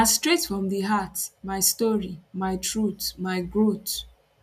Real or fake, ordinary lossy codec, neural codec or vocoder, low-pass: real; none; none; 14.4 kHz